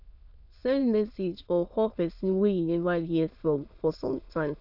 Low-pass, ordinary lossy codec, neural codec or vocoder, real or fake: 5.4 kHz; none; autoencoder, 22.05 kHz, a latent of 192 numbers a frame, VITS, trained on many speakers; fake